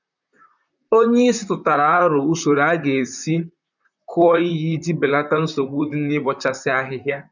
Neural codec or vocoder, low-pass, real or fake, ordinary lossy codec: vocoder, 44.1 kHz, 128 mel bands, Pupu-Vocoder; 7.2 kHz; fake; none